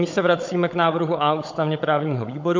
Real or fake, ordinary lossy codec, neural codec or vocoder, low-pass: fake; MP3, 48 kbps; codec, 16 kHz, 16 kbps, FunCodec, trained on Chinese and English, 50 frames a second; 7.2 kHz